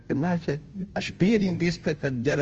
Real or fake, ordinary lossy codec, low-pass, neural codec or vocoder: fake; Opus, 24 kbps; 7.2 kHz; codec, 16 kHz, 0.5 kbps, FunCodec, trained on Chinese and English, 25 frames a second